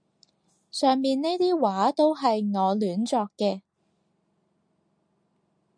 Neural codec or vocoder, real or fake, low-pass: none; real; 9.9 kHz